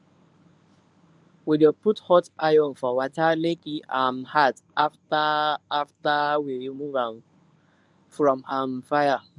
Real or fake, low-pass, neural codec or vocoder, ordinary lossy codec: fake; none; codec, 24 kHz, 0.9 kbps, WavTokenizer, medium speech release version 1; none